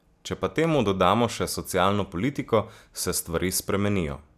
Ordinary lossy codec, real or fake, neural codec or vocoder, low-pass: none; real; none; 14.4 kHz